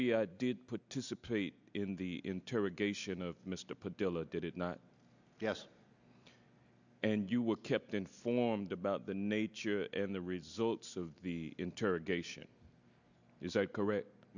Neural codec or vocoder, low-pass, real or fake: none; 7.2 kHz; real